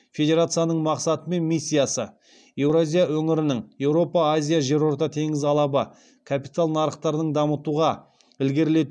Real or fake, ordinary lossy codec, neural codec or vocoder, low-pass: real; none; none; 9.9 kHz